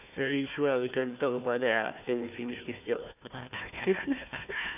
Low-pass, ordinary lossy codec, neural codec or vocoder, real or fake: 3.6 kHz; none; codec, 16 kHz, 1 kbps, FunCodec, trained on Chinese and English, 50 frames a second; fake